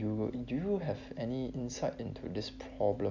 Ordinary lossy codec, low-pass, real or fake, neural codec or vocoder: MP3, 64 kbps; 7.2 kHz; real; none